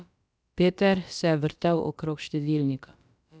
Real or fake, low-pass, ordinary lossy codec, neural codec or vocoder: fake; none; none; codec, 16 kHz, about 1 kbps, DyCAST, with the encoder's durations